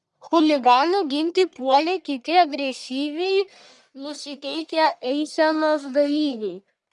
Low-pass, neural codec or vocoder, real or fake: 10.8 kHz; codec, 44.1 kHz, 1.7 kbps, Pupu-Codec; fake